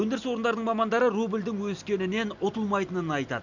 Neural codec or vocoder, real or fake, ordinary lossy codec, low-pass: none; real; none; 7.2 kHz